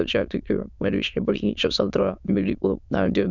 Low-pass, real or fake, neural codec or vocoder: 7.2 kHz; fake; autoencoder, 22.05 kHz, a latent of 192 numbers a frame, VITS, trained on many speakers